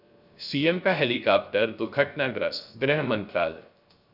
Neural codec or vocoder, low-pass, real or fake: codec, 16 kHz, 0.3 kbps, FocalCodec; 5.4 kHz; fake